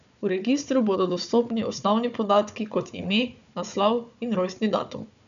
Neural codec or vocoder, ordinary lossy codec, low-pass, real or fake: codec, 16 kHz, 4 kbps, FunCodec, trained on Chinese and English, 50 frames a second; none; 7.2 kHz; fake